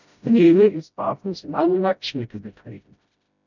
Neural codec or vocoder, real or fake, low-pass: codec, 16 kHz, 0.5 kbps, FreqCodec, smaller model; fake; 7.2 kHz